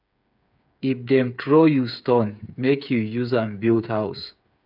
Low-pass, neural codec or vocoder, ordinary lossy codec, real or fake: 5.4 kHz; codec, 16 kHz, 8 kbps, FreqCodec, smaller model; none; fake